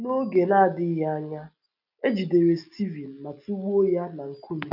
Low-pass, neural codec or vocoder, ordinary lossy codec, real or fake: 5.4 kHz; none; none; real